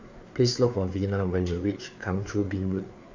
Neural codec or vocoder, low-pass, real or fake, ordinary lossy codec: codec, 16 kHz, 4 kbps, FreqCodec, larger model; 7.2 kHz; fake; AAC, 48 kbps